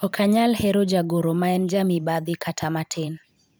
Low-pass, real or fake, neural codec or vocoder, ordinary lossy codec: none; fake; vocoder, 44.1 kHz, 128 mel bands every 512 samples, BigVGAN v2; none